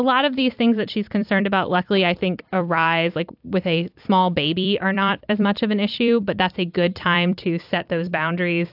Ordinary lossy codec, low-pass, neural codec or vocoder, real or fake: AAC, 48 kbps; 5.4 kHz; vocoder, 44.1 kHz, 128 mel bands every 256 samples, BigVGAN v2; fake